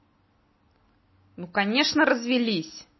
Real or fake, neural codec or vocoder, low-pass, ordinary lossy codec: real; none; 7.2 kHz; MP3, 24 kbps